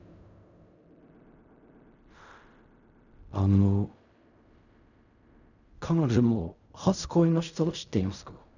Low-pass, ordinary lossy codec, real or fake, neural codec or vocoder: 7.2 kHz; none; fake; codec, 16 kHz in and 24 kHz out, 0.4 kbps, LongCat-Audio-Codec, fine tuned four codebook decoder